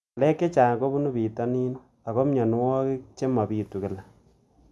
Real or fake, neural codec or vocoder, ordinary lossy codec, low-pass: real; none; none; none